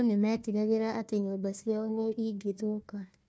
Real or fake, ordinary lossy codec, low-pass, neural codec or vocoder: fake; none; none; codec, 16 kHz, 1 kbps, FunCodec, trained on Chinese and English, 50 frames a second